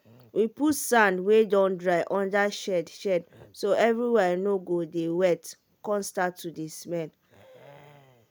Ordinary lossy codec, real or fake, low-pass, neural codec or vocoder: none; real; none; none